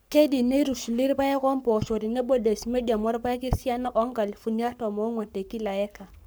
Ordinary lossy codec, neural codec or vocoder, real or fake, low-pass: none; codec, 44.1 kHz, 7.8 kbps, Pupu-Codec; fake; none